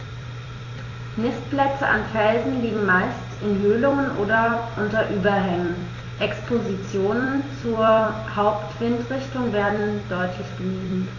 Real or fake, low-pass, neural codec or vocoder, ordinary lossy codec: fake; 7.2 kHz; vocoder, 44.1 kHz, 128 mel bands every 256 samples, BigVGAN v2; AAC, 32 kbps